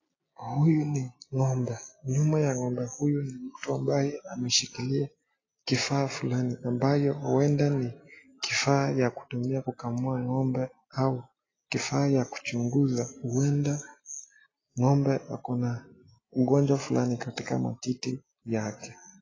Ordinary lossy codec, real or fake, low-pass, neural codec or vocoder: AAC, 32 kbps; real; 7.2 kHz; none